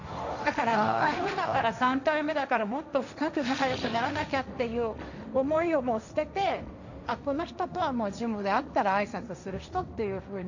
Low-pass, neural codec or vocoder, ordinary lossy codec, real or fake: 7.2 kHz; codec, 16 kHz, 1.1 kbps, Voila-Tokenizer; none; fake